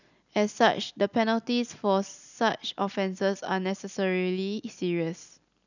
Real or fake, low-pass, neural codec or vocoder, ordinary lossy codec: real; 7.2 kHz; none; none